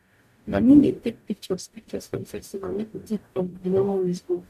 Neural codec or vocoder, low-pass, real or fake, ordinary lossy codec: codec, 44.1 kHz, 0.9 kbps, DAC; 14.4 kHz; fake; AAC, 96 kbps